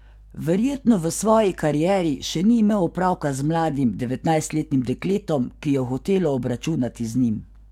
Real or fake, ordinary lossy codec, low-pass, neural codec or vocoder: fake; MP3, 96 kbps; 19.8 kHz; codec, 44.1 kHz, 7.8 kbps, DAC